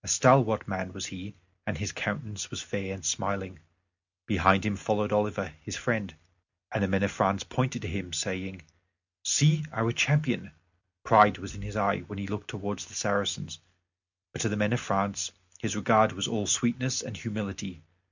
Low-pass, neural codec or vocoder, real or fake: 7.2 kHz; none; real